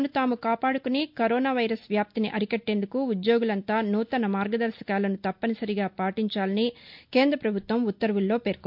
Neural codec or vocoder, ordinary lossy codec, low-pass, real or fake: none; MP3, 48 kbps; 5.4 kHz; real